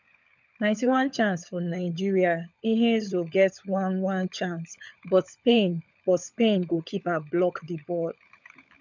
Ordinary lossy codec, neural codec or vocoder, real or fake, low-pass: none; codec, 16 kHz, 16 kbps, FunCodec, trained on LibriTTS, 50 frames a second; fake; 7.2 kHz